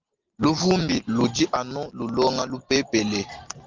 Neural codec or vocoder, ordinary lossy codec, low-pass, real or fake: none; Opus, 32 kbps; 7.2 kHz; real